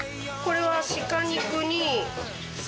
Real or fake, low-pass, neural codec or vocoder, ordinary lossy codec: real; none; none; none